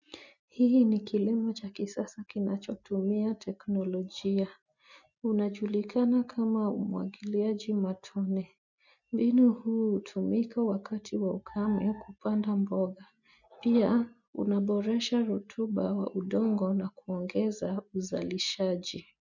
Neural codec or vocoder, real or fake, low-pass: none; real; 7.2 kHz